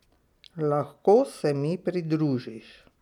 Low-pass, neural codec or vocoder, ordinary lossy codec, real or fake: 19.8 kHz; none; none; real